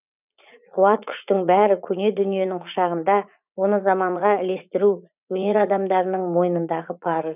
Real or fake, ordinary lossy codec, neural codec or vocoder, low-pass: fake; none; vocoder, 44.1 kHz, 80 mel bands, Vocos; 3.6 kHz